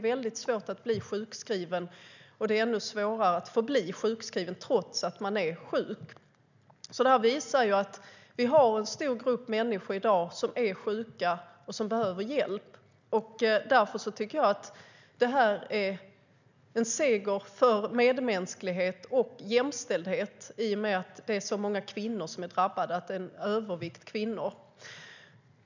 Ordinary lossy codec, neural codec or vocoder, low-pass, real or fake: none; none; 7.2 kHz; real